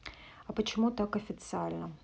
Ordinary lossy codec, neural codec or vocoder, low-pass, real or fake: none; none; none; real